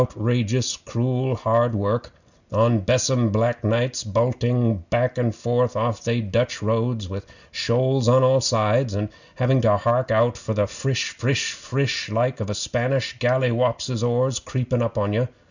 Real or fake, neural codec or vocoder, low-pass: real; none; 7.2 kHz